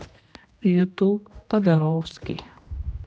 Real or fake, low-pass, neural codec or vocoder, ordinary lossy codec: fake; none; codec, 16 kHz, 1 kbps, X-Codec, HuBERT features, trained on general audio; none